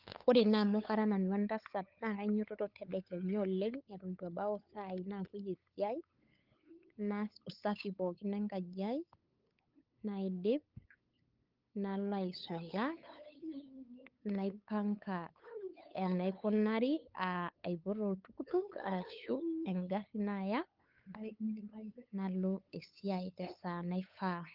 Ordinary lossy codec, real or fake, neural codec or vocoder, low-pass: Opus, 32 kbps; fake; codec, 16 kHz, 8 kbps, FunCodec, trained on LibriTTS, 25 frames a second; 5.4 kHz